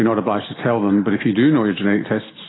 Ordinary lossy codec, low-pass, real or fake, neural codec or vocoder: AAC, 16 kbps; 7.2 kHz; real; none